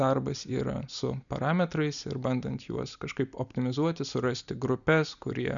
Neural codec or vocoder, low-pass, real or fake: none; 7.2 kHz; real